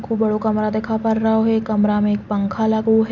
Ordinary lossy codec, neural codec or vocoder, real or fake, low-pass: none; none; real; 7.2 kHz